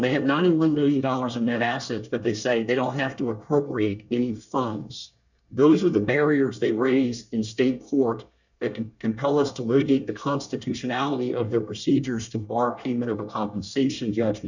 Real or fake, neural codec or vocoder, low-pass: fake; codec, 24 kHz, 1 kbps, SNAC; 7.2 kHz